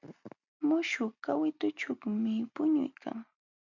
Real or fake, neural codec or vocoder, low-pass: real; none; 7.2 kHz